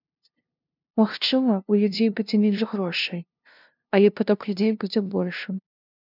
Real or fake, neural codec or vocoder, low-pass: fake; codec, 16 kHz, 0.5 kbps, FunCodec, trained on LibriTTS, 25 frames a second; 5.4 kHz